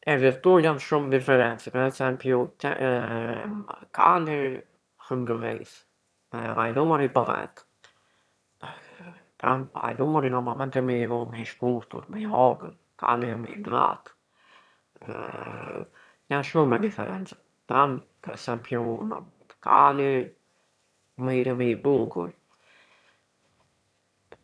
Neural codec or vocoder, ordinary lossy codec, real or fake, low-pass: autoencoder, 22.05 kHz, a latent of 192 numbers a frame, VITS, trained on one speaker; none; fake; none